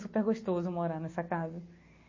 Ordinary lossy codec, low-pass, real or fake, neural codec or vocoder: MP3, 32 kbps; 7.2 kHz; real; none